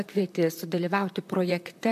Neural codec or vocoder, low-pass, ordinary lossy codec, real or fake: vocoder, 44.1 kHz, 128 mel bands, Pupu-Vocoder; 14.4 kHz; MP3, 96 kbps; fake